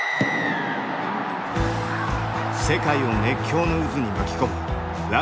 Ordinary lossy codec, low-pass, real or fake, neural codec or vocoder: none; none; real; none